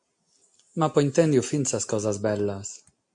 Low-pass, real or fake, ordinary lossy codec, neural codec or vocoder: 9.9 kHz; real; MP3, 64 kbps; none